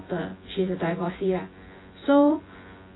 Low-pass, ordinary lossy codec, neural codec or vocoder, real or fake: 7.2 kHz; AAC, 16 kbps; vocoder, 24 kHz, 100 mel bands, Vocos; fake